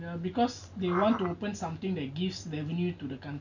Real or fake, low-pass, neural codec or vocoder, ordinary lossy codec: real; 7.2 kHz; none; none